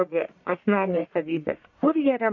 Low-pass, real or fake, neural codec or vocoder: 7.2 kHz; fake; codec, 44.1 kHz, 1.7 kbps, Pupu-Codec